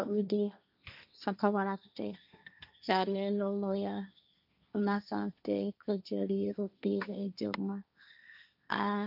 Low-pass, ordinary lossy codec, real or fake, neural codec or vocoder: 5.4 kHz; none; fake; codec, 16 kHz, 1.1 kbps, Voila-Tokenizer